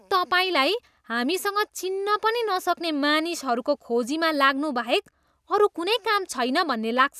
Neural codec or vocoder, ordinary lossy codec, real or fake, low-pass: none; none; real; 14.4 kHz